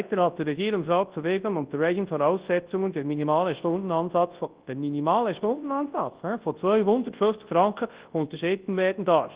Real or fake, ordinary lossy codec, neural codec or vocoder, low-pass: fake; Opus, 16 kbps; codec, 24 kHz, 0.9 kbps, WavTokenizer, large speech release; 3.6 kHz